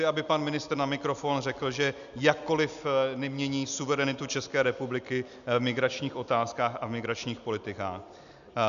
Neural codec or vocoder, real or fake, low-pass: none; real; 7.2 kHz